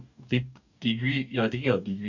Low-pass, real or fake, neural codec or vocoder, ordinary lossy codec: 7.2 kHz; fake; codec, 44.1 kHz, 2.6 kbps, DAC; none